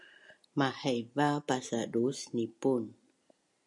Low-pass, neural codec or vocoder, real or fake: 10.8 kHz; none; real